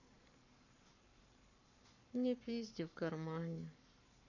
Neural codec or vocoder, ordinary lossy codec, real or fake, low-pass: codec, 44.1 kHz, 7.8 kbps, Pupu-Codec; none; fake; 7.2 kHz